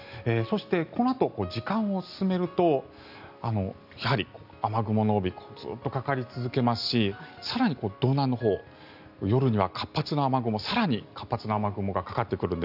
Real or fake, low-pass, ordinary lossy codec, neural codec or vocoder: real; 5.4 kHz; none; none